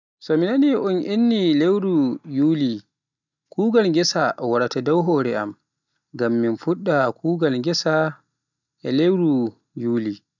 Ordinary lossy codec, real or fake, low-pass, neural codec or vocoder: none; real; 7.2 kHz; none